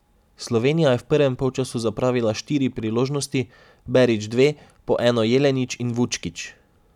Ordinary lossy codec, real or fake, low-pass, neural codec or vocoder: none; real; 19.8 kHz; none